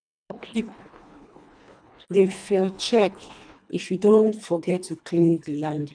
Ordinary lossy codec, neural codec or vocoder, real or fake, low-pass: none; codec, 24 kHz, 1.5 kbps, HILCodec; fake; 9.9 kHz